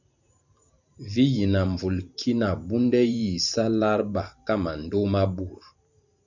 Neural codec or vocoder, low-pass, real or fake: vocoder, 44.1 kHz, 128 mel bands every 512 samples, BigVGAN v2; 7.2 kHz; fake